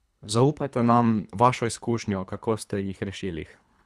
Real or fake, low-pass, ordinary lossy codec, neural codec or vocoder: fake; none; none; codec, 24 kHz, 3 kbps, HILCodec